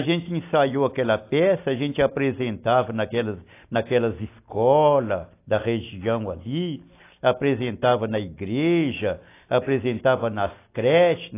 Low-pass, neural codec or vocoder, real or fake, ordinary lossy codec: 3.6 kHz; none; real; AAC, 24 kbps